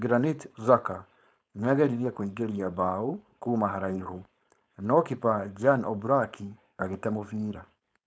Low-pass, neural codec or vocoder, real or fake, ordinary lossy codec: none; codec, 16 kHz, 4.8 kbps, FACodec; fake; none